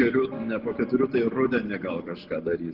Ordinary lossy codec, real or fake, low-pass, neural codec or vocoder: Opus, 16 kbps; real; 5.4 kHz; none